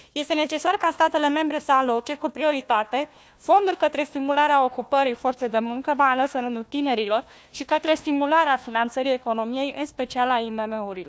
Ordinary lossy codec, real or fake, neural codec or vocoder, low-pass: none; fake; codec, 16 kHz, 1 kbps, FunCodec, trained on Chinese and English, 50 frames a second; none